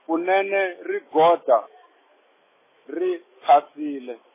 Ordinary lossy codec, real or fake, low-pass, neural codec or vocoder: MP3, 16 kbps; fake; 3.6 kHz; autoencoder, 48 kHz, 128 numbers a frame, DAC-VAE, trained on Japanese speech